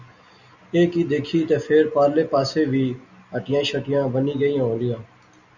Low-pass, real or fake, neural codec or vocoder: 7.2 kHz; real; none